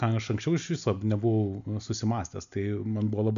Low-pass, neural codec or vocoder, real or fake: 7.2 kHz; none; real